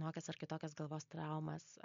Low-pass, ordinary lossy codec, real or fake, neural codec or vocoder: 7.2 kHz; MP3, 48 kbps; real; none